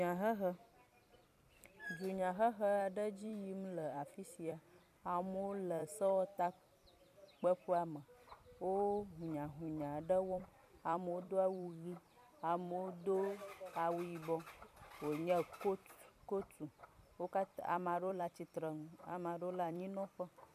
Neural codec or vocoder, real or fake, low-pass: vocoder, 44.1 kHz, 128 mel bands every 256 samples, BigVGAN v2; fake; 14.4 kHz